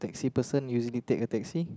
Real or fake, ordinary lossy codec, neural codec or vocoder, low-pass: real; none; none; none